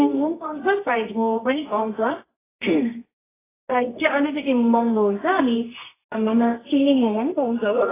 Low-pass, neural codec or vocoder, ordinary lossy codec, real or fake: 3.6 kHz; codec, 24 kHz, 0.9 kbps, WavTokenizer, medium music audio release; AAC, 16 kbps; fake